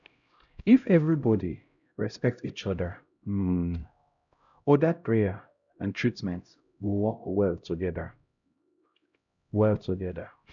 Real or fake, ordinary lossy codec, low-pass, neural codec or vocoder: fake; none; 7.2 kHz; codec, 16 kHz, 0.5 kbps, X-Codec, HuBERT features, trained on LibriSpeech